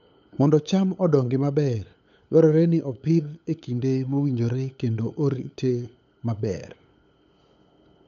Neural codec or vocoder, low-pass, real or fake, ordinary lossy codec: codec, 16 kHz, 8 kbps, FunCodec, trained on LibriTTS, 25 frames a second; 7.2 kHz; fake; none